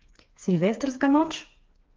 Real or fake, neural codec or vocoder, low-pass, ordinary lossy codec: fake; codec, 16 kHz, 4 kbps, FreqCodec, smaller model; 7.2 kHz; Opus, 24 kbps